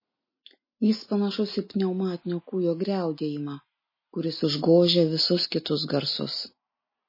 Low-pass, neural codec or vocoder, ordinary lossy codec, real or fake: 5.4 kHz; none; MP3, 24 kbps; real